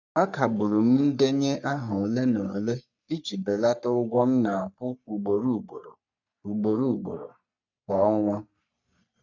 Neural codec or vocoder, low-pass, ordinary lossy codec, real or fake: codec, 44.1 kHz, 3.4 kbps, Pupu-Codec; 7.2 kHz; none; fake